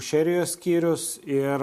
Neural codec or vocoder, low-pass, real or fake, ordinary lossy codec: none; 14.4 kHz; real; MP3, 64 kbps